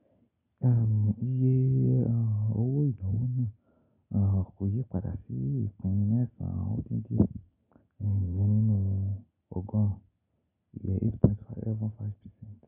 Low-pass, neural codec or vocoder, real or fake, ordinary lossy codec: 3.6 kHz; none; real; none